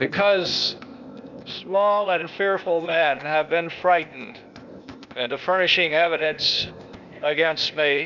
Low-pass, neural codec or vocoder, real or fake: 7.2 kHz; codec, 16 kHz, 0.8 kbps, ZipCodec; fake